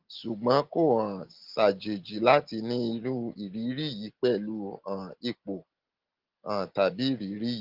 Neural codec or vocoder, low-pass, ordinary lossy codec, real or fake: none; 5.4 kHz; Opus, 16 kbps; real